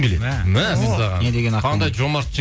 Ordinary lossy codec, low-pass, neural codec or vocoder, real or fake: none; none; none; real